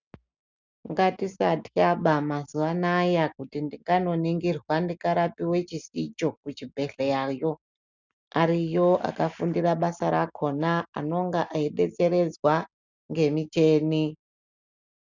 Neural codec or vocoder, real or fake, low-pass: none; real; 7.2 kHz